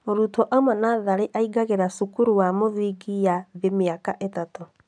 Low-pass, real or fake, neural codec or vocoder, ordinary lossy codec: 9.9 kHz; real; none; none